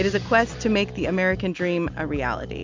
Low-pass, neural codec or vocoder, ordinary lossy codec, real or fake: 7.2 kHz; none; MP3, 64 kbps; real